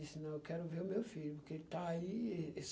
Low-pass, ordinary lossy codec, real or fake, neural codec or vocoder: none; none; real; none